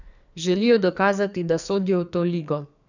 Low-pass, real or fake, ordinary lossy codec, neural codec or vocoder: 7.2 kHz; fake; none; codec, 44.1 kHz, 2.6 kbps, SNAC